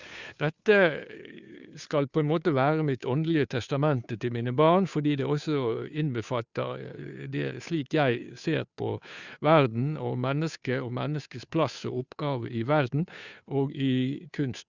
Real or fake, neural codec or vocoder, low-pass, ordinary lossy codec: fake; codec, 16 kHz, 2 kbps, FunCodec, trained on Chinese and English, 25 frames a second; 7.2 kHz; Opus, 64 kbps